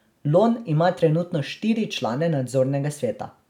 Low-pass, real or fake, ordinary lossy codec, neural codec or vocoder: 19.8 kHz; real; none; none